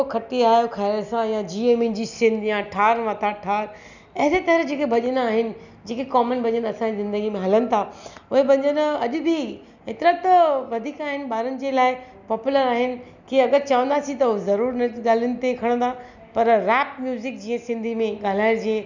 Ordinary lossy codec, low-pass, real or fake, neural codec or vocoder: none; 7.2 kHz; real; none